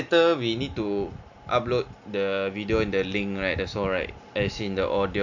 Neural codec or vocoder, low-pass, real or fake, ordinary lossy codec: none; 7.2 kHz; real; none